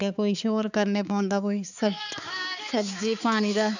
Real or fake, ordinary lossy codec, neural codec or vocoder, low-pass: fake; none; codec, 16 kHz, 4 kbps, X-Codec, HuBERT features, trained on balanced general audio; 7.2 kHz